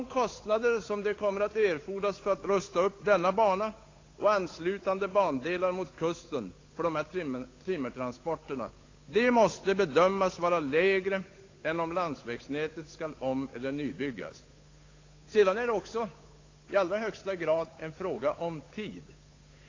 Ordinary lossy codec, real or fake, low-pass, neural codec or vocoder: AAC, 32 kbps; fake; 7.2 kHz; codec, 16 kHz, 8 kbps, FunCodec, trained on Chinese and English, 25 frames a second